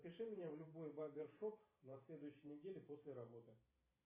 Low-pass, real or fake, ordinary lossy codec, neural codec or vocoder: 3.6 kHz; fake; MP3, 16 kbps; vocoder, 44.1 kHz, 128 mel bands every 512 samples, BigVGAN v2